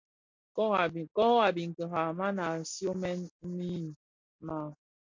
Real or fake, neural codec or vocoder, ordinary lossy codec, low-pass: real; none; MP3, 48 kbps; 7.2 kHz